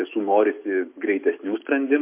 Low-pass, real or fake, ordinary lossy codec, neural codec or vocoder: 3.6 kHz; real; MP3, 24 kbps; none